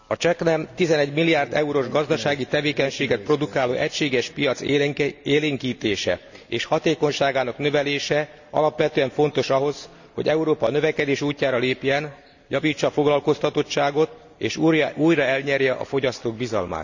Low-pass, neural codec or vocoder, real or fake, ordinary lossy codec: 7.2 kHz; none; real; none